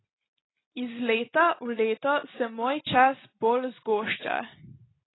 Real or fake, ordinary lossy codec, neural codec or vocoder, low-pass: real; AAC, 16 kbps; none; 7.2 kHz